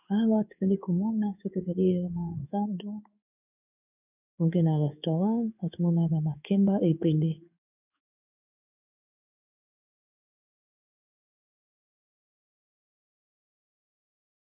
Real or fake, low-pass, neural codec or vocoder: fake; 3.6 kHz; codec, 16 kHz in and 24 kHz out, 1 kbps, XY-Tokenizer